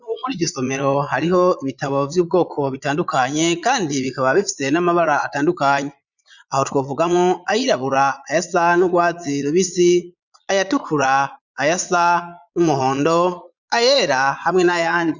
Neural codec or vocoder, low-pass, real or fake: vocoder, 22.05 kHz, 80 mel bands, Vocos; 7.2 kHz; fake